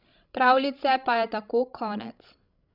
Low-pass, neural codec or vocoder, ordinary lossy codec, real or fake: 5.4 kHz; codec, 16 kHz, 16 kbps, FreqCodec, larger model; none; fake